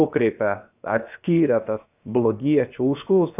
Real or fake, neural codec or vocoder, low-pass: fake; codec, 16 kHz, 0.7 kbps, FocalCodec; 3.6 kHz